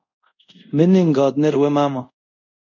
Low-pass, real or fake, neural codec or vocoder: 7.2 kHz; fake; codec, 24 kHz, 0.5 kbps, DualCodec